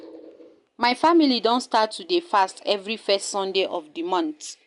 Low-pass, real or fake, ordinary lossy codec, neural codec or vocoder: 10.8 kHz; real; MP3, 96 kbps; none